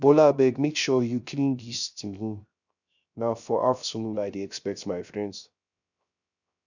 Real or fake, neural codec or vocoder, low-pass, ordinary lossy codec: fake; codec, 16 kHz, 0.3 kbps, FocalCodec; 7.2 kHz; none